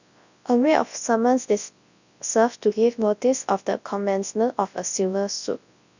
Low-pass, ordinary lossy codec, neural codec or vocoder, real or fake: 7.2 kHz; none; codec, 24 kHz, 0.9 kbps, WavTokenizer, large speech release; fake